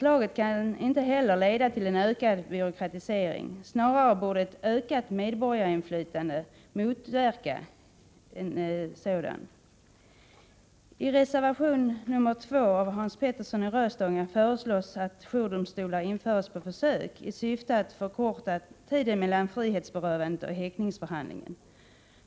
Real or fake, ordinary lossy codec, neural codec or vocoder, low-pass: real; none; none; none